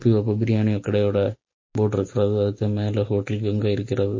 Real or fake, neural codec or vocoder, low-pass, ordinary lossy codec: real; none; 7.2 kHz; MP3, 32 kbps